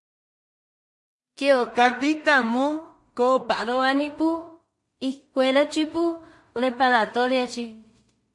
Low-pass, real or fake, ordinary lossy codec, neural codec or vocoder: 10.8 kHz; fake; MP3, 48 kbps; codec, 16 kHz in and 24 kHz out, 0.4 kbps, LongCat-Audio-Codec, two codebook decoder